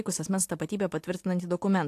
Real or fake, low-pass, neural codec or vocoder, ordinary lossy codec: real; 14.4 kHz; none; AAC, 64 kbps